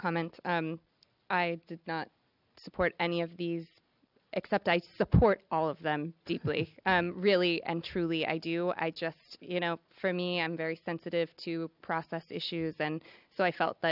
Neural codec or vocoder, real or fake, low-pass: none; real; 5.4 kHz